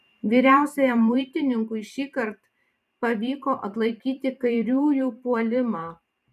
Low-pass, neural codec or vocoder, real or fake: 14.4 kHz; vocoder, 44.1 kHz, 128 mel bands every 512 samples, BigVGAN v2; fake